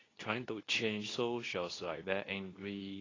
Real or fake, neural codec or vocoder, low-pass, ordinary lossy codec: fake; codec, 24 kHz, 0.9 kbps, WavTokenizer, medium speech release version 2; 7.2 kHz; AAC, 32 kbps